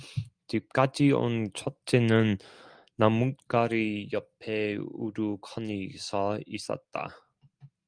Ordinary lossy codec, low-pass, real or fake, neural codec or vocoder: Opus, 32 kbps; 9.9 kHz; real; none